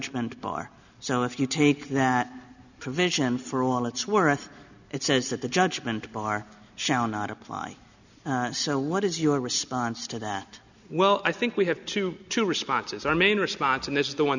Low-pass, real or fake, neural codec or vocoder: 7.2 kHz; real; none